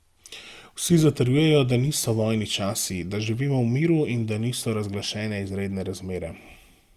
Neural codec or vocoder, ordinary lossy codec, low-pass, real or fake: none; Opus, 32 kbps; 14.4 kHz; real